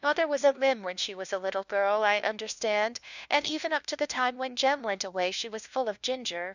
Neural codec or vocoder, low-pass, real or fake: codec, 16 kHz, 1 kbps, FunCodec, trained on LibriTTS, 50 frames a second; 7.2 kHz; fake